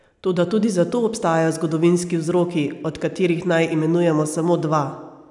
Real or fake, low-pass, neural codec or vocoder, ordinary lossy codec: real; 10.8 kHz; none; none